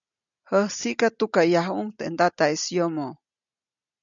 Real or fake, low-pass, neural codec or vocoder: real; 7.2 kHz; none